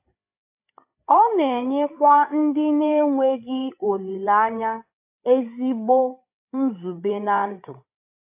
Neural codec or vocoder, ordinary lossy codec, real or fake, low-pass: codec, 16 kHz, 8 kbps, FreqCodec, larger model; AAC, 32 kbps; fake; 3.6 kHz